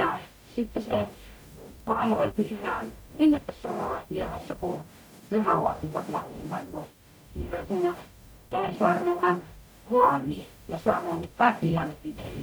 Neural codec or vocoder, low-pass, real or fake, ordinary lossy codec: codec, 44.1 kHz, 0.9 kbps, DAC; none; fake; none